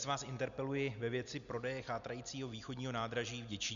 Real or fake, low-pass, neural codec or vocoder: real; 7.2 kHz; none